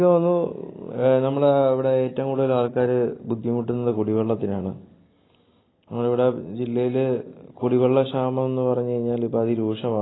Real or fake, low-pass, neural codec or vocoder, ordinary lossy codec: real; 7.2 kHz; none; AAC, 16 kbps